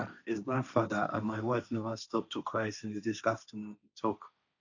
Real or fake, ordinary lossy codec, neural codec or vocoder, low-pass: fake; none; codec, 16 kHz, 1.1 kbps, Voila-Tokenizer; 7.2 kHz